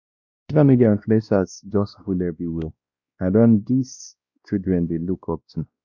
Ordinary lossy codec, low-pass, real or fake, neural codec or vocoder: none; 7.2 kHz; fake; codec, 16 kHz, 1 kbps, X-Codec, WavLM features, trained on Multilingual LibriSpeech